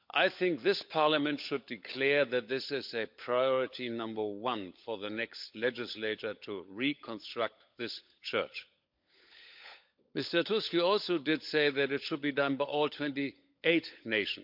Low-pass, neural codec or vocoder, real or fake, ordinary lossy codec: 5.4 kHz; codec, 16 kHz, 16 kbps, FunCodec, trained on LibriTTS, 50 frames a second; fake; MP3, 48 kbps